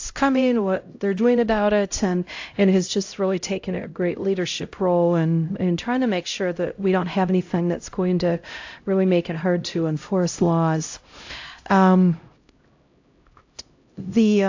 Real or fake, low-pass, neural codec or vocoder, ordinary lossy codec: fake; 7.2 kHz; codec, 16 kHz, 0.5 kbps, X-Codec, HuBERT features, trained on LibriSpeech; AAC, 48 kbps